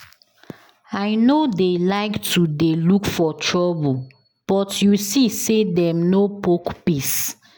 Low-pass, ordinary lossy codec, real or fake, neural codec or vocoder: none; none; real; none